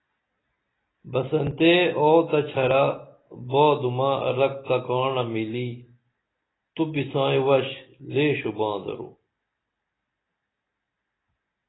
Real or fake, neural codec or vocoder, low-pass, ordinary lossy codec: real; none; 7.2 kHz; AAC, 16 kbps